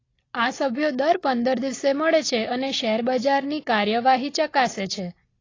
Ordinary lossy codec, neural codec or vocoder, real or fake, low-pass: AAC, 32 kbps; none; real; 7.2 kHz